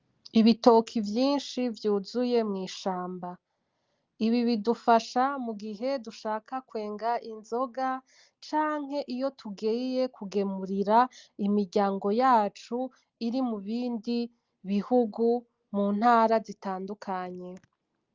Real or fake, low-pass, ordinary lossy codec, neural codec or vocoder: real; 7.2 kHz; Opus, 32 kbps; none